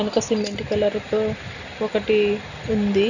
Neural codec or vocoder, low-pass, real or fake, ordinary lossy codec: none; 7.2 kHz; real; none